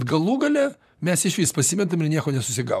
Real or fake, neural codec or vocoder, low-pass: fake; vocoder, 44.1 kHz, 128 mel bands, Pupu-Vocoder; 14.4 kHz